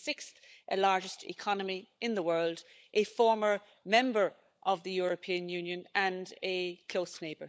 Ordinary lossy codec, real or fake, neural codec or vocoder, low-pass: none; fake; codec, 16 kHz, 16 kbps, FunCodec, trained on LibriTTS, 50 frames a second; none